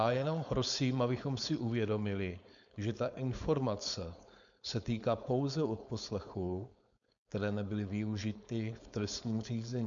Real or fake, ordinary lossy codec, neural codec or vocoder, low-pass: fake; MP3, 96 kbps; codec, 16 kHz, 4.8 kbps, FACodec; 7.2 kHz